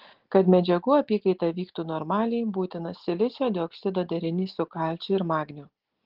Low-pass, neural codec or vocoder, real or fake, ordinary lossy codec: 5.4 kHz; none; real; Opus, 16 kbps